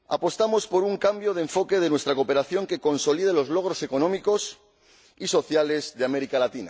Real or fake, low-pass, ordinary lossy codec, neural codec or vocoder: real; none; none; none